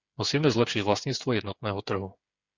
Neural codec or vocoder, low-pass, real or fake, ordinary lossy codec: codec, 16 kHz, 8 kbps, FreqCodec, smaller model; 7.2 kHz; fake; Opus, 64 kbps